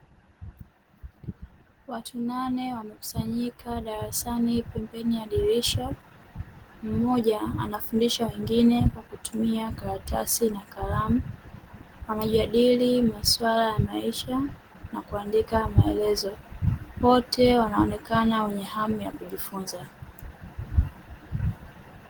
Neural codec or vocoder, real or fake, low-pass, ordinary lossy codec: none; real; 19.8 kHz; Opus, 16 kbps